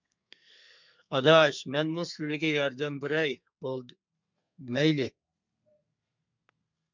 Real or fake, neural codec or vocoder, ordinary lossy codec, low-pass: fake; codec, 32 kHz, 1.9 kbps, SNAC; MP3, 64 kbps; 7.2 kHz